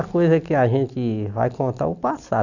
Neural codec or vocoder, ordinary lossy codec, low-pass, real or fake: none; none; 7.2 kHz; real